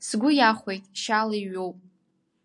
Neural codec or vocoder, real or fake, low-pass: none; real; 10.8 kHz